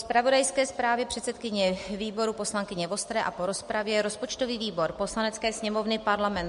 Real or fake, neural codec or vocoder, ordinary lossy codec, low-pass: real; none; MP3, 48 kbps; 10.8 kHz